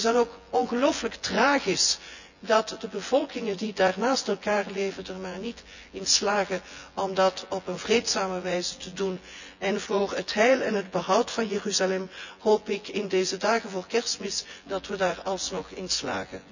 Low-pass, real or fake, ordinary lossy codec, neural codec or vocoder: 7.2 kHz; fake; none; vocoder, 24 kHz, 100 mel bands, Vocos